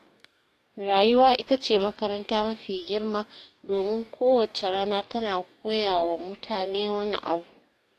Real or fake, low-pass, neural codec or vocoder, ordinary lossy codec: fake; 14.4 kHz; codec, 44.1 kHz, 2.6 kbps, DAC; AAC, 64 kbps